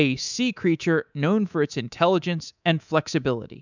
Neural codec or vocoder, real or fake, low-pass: codec, 24 kHz, 3.1 kbps, DualCodec; fake; 7.2 kHz